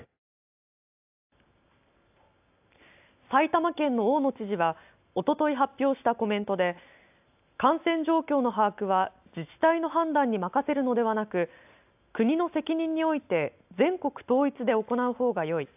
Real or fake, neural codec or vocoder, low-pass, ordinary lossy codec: real; none; 3.6 kHz; AAC, 32 kbps